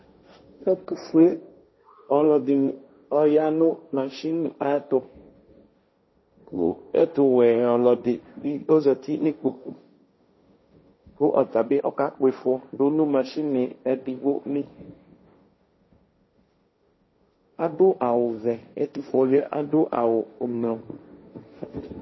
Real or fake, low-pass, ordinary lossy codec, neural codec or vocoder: fake; 7.2 kHz; MP3, 24 kbps; codec, 16 kHz, 1.1 kbps, Voila-Tokenizer